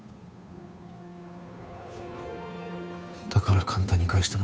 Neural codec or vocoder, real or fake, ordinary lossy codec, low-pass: codec, 16 kHz, 2 kbps, FunCodec, trained on Chinese and English, 25 frames a second; fake; none; none